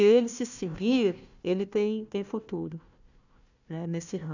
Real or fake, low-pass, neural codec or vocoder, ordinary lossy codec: fake; 7.2 kHz; codec, 16 kHz, 1 kbps, FunCodec, trained on Chinese and English, 50 frames a second; none